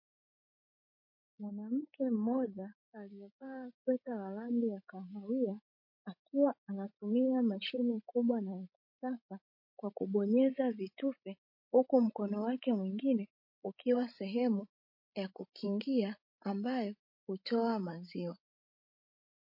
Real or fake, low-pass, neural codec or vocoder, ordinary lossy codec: fake; 5.4 kHz; codec, 16 kHz, 16 kbps, FreqCodec, larger model; MP3, 32 kbps